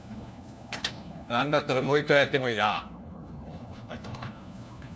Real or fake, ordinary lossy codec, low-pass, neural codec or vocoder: fake; none; none; codec, 16 kHz, 1 kbps, FunCodec, trained on LibriTTS, 50 frames a second